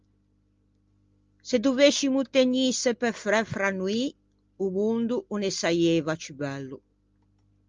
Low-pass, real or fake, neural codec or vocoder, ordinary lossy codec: 7.2 kHz; real; none; Opus, 32 kbps